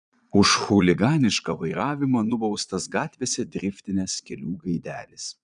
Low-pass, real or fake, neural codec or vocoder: 9.9 kHz; fake; vocoder, 22.05 kHz, 80 mel bands, Vocos